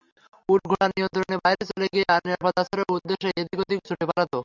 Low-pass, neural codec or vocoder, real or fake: 7.2 kHz; none; real